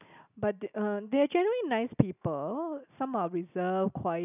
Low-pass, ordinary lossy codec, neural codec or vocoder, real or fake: 3.6 kHz; none; none; real